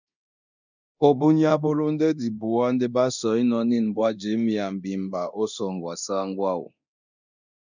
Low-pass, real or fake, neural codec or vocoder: 7.2 kHz; fake; codec, 24 kHz, 0.9 kbps, DualCodec